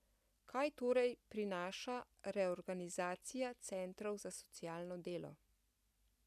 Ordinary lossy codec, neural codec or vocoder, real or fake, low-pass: none; none; real; 14.4 kHz